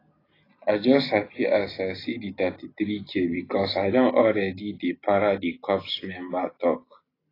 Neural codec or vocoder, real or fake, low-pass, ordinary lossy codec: none; real; 5.4 kHz; AAC, 24 kbps